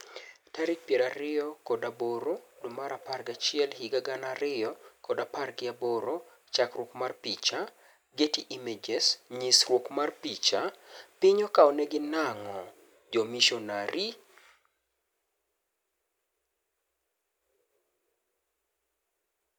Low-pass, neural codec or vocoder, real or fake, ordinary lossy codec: none; none; real; none